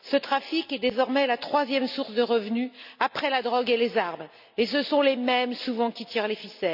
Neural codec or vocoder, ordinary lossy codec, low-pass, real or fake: none; none; 5.4 kHz; real